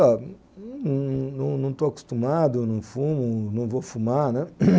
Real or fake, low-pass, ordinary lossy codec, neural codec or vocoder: real; none; none; none